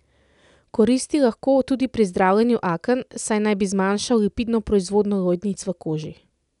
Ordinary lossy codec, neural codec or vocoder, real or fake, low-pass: none; none; real; 10.8 kHz